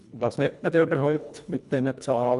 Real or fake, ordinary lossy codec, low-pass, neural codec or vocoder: fake; none; 10.8 kHz; codec, 24 kHz, 1.5 kbps, HILCodec